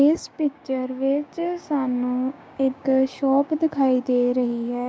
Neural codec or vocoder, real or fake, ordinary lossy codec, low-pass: codec, 16 kHz, 6 kbps, DAC; fake; none; none